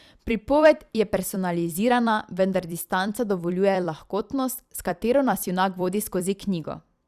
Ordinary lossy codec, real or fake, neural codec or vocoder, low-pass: Opus, 64 kbps; fake; vocoder, 44.1 kHz, 128 mel bands every 256 samples, BigVGAN v2; 14.4 kHz